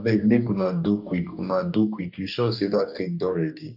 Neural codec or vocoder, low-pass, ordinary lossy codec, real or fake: codec, 44.1 kHz, 2.6 kbps, DAC; 5.4 kHz; none; fake